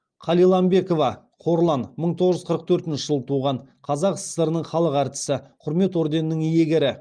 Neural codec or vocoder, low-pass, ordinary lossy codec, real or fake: none; 9.9 kHz; Opus, 32 kbps; real